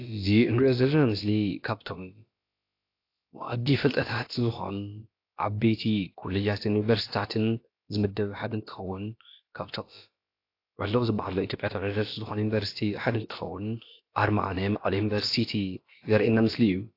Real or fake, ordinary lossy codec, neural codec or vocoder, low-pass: fake; AAC, 32 kbps; codec, 16 kHz, about 1 kbps, DyCAST, with the encoder's durations; 5.4 kHz